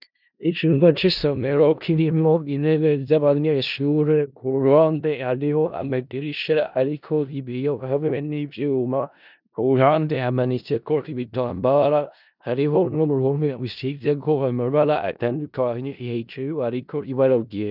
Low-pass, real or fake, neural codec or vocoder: 5.4 kHz; fake; codec, 16 kHz in and 24 kHz out, 0.4 kbps, LongCat-Audio-Codec, four codebook decoder